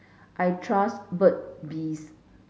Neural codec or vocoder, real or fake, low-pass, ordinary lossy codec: none; real; none; none